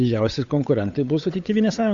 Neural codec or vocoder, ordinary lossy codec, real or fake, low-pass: codec, 16 kHz, 8 kbps, FunCodec, trained on Chinese and English, 25 frames a second; Opus, 64 kbps; fake; 7.2 kHz